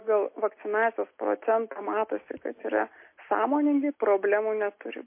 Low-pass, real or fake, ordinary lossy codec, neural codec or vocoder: 3.6 kHz; real; MP3, 24 kbps; none